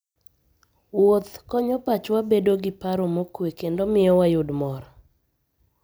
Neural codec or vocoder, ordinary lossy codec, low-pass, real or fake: none; none; none; real